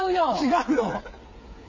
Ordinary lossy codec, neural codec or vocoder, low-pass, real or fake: MP3, 32 kbps; codec, 16 kHz, 8 kbps, FreqCodec, smaller model; 7.2 kHz; fake